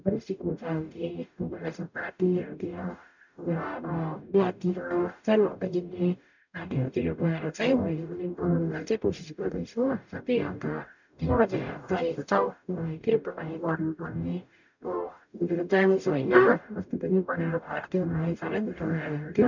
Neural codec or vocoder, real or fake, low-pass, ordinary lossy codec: codec, 44.1 kHz, 0.9 kbps, DAC; fake; 7.2 kHz; none